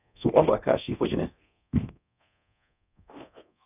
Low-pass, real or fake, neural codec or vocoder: 3.6 kHz; fake; codec, 24 kHz, 0.9 kbps, DualCodec